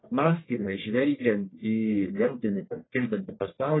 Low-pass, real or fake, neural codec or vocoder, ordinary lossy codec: 7.2 kHz; fake; codec, 44.1 kHz, 1.7 kbps, Pupu-Codec; AAC, 16 kbps